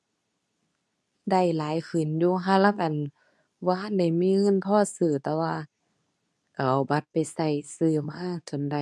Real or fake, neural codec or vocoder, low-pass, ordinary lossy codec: fake; codec, 24 kHz, 0.9 kbps, WavTokenizer, medium speech release version 2; none; none